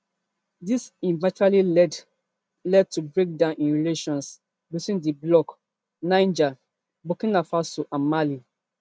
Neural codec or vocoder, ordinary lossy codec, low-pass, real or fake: none; none; none; real